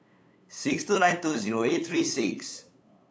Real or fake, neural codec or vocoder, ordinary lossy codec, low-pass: fake; codec, 16 kHz, 8 kbps, FunCodec, trained on LibriTTS, 25 frames a second; none; none